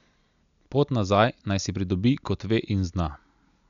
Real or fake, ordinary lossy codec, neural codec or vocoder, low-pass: real; none; none; 7.2 kHz